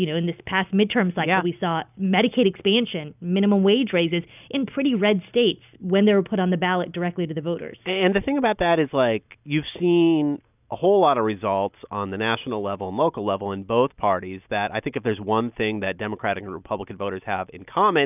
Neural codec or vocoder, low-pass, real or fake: none; 3.6 kHz; real